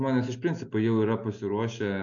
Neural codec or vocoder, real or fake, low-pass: none; real; 7.2 kHz